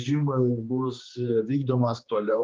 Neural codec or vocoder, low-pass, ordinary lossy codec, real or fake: codec, 16 kHz, 2 kbps, X-Codec, HuBERT features, trained on general audio; 7.2 kHz; Opus, 24 kbps; fake